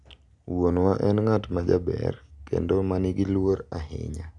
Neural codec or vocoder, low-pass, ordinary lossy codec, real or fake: none; 10.8 kHz; none; real